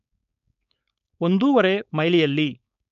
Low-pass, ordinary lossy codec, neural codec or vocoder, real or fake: 7.2 kHz; none; codec, 16 kHz, 4.8 kbps, FACodec; fake